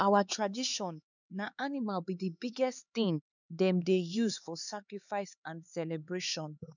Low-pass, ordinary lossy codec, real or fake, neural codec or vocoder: 7.2 kHz; none; fake; codec, 16 kHz, 4 kbps, X-Codec, HuBERT features, trained on LibriSpeech